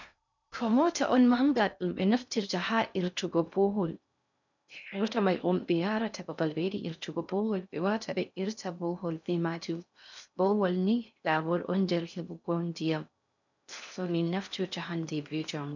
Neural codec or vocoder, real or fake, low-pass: codec, 16 kHz in and 24 kHz out, 0.6 kbps, FocalCodec, streaming, 2048 codes; fake; 7.2 kHz